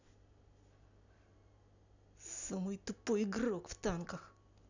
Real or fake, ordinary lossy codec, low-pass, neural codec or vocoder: real; none; 7.2 kHz; none